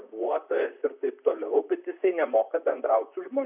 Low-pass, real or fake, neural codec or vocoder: 3.6 kHz; fake; vocoder, 44.1 kHz, 128 mel bands, Pupu-Vocoder